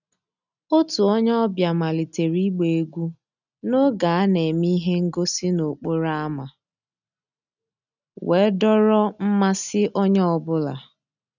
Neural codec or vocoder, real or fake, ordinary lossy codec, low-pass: none; real; none; 7.2 kHz